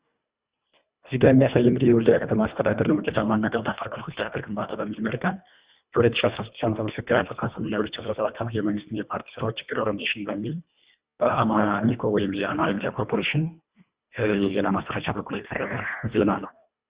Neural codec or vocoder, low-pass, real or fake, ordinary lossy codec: codec, 24 kHz, 1.5 kbps, HILCodec; 3.6 kHz; fake; Opus, 64 kbps